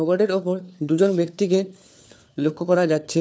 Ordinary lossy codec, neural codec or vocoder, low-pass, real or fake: none; codec, 16 kHz, 4 kbps, FunCodec, trained on LibriTTS, 50 frames a second; none; fake